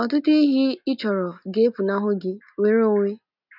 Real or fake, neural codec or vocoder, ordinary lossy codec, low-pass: real; none; none; 5.4 kHz